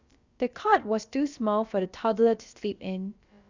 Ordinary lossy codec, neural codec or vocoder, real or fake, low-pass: Opus, 64 kbps; codec, 16 kHz, about 1 kbps, DyCAST, with the encoder's durations; fake; 7.2 kHz